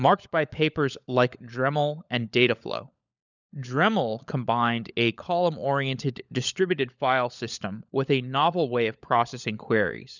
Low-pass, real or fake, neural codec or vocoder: 7.2 kHz; fake; codec, 16 kHz, 16 kbps, FunCodec, trained on LibriTTS, 50 frames a second